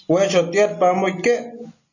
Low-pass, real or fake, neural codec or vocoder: 7.2 kHz; real; none